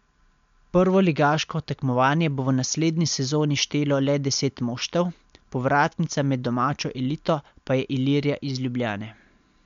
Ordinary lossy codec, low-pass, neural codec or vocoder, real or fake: MP3, 64 kbps; 7.2 kHz; none; real